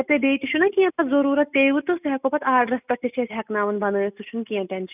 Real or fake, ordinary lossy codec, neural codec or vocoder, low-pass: real; none; none; 3.6 kHz